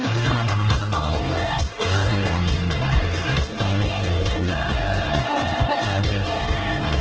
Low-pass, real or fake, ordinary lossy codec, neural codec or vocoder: 7.2 kHz; fake; Opus, 16 kbps; codec, 24 kHz, 1 kbps, SNAC